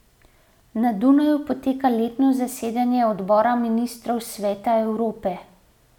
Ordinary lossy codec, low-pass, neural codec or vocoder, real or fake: none; 19.8 kHz; none; real